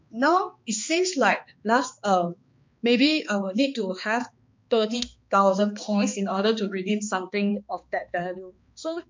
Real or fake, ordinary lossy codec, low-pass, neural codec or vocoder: fake; MP3, 48 kbps; 7.2 kHz; codec, 16 kHz, 2 kbps, X-Codec, HuBERT features, trained on balanced general audio